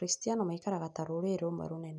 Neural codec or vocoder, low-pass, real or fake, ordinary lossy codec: none; 10.8 kHz; real; none